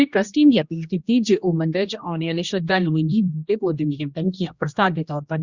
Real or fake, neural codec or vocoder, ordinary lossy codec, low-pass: fake; codec, 16 kHz, 1 kbps, X-Codec, HuBERT features, trained on general audio; Opus, 64 kbps; 7.2 kHz